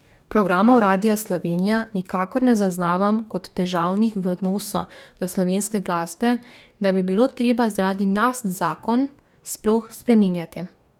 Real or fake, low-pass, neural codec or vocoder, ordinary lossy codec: fake; 19.8 kHz; codec, 44.1 kHz, 2.6 kbps, DAC; none